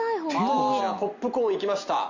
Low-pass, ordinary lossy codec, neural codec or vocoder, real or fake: 7.2 kHz; Opus, 64 kbps; none; real